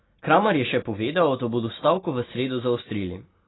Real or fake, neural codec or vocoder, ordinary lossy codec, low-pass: real; none; AAC, 16 kbps; 7.2 kHz